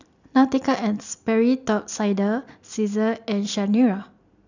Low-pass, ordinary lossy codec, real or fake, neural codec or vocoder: 7.2 kHz; none; real; none